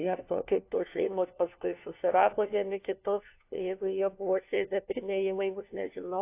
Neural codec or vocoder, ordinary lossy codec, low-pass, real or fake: codec, 16 kHz, 1 kbps, FunCodec, trained on LibriTTS, 50 frames a second; AAC, 24 kbps; 3.6 kHz; fake